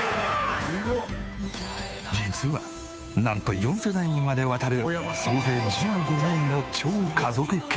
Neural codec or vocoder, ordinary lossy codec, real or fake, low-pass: codec, 16 kHz, 2 kbps, FunCodec, trained on Chinese and English, 25 frames a second; none; fake; none